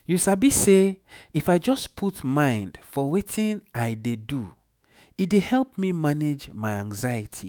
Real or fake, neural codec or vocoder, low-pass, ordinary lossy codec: fake; autoencoder, 48 kHz, 128 numbers a frame, DAC-VAE, trained on Japanese speech; none; none